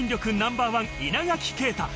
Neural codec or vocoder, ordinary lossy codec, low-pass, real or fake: none; none; none; real